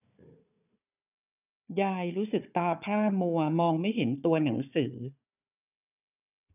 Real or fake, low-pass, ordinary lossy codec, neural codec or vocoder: fake; 3.6 kHz; none; codec, 16 kHz, 4 kbps, FunCodec, trained on Chinese and English, 50 frames a second